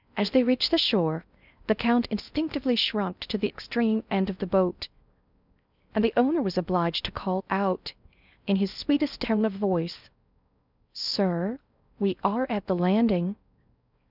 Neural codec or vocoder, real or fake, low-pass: codec, 16 kHz in and 24 kHz out, 0.6 kbps, FocalCodec, streaming, 4096 codes; fake; 5.4 kHz